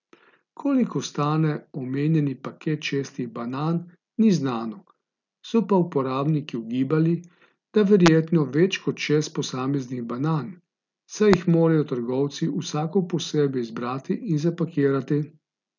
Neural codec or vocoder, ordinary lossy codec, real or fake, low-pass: none; none; real; 7.2 kHz